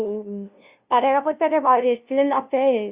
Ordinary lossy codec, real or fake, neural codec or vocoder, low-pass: Opus, 64 kbps; fake; codec, 16 kHz, 0.5 kbps, FunCodec, trained on LibriTTS, 25 frames a second; 3.6 kHz